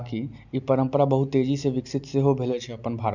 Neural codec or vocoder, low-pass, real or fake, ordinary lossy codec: none; 7.2 kHz; real; none